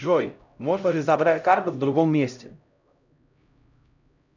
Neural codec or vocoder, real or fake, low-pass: codec, 16 kHz, 0.5 kbps, X-Codec, HuBERT features, trained on LibriSpeech; fake; 7.2 kHz